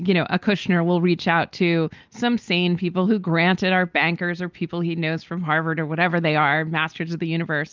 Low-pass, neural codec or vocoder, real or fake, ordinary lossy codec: 7.2 kHz; none; real; Opus, 24 kbps